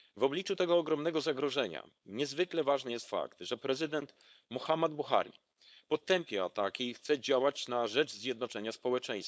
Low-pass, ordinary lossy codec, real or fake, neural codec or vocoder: none; none; fake; codec, 16 kHz, 4.8 kbps, FACodec